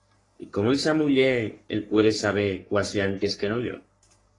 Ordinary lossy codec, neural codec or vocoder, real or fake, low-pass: AAC, 32 kbps; codec, 44.1 kHz, 3.4 kbps, Pupu-Codec; fake; 10.8 kHz